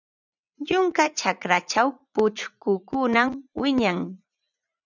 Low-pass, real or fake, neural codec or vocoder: 7.2 kHz; fake; vocoder, 44.1 kHz, 80 mel bands, Vocos